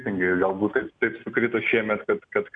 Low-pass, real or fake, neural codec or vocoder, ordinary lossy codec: 9.9 kHz; real; none; MP3, 96 kbps